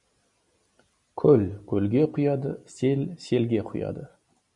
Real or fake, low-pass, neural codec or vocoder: real; 10.8 kHz; none